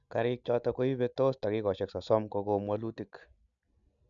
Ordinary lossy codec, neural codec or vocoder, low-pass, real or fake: none; none; 7.2 kHz; real